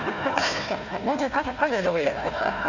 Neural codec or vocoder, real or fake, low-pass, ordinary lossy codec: codec, 16 kHz, 1 kbps, FunCodec, trained on Chinese and English, 50 frames a second; fake; 7.2 kHz; none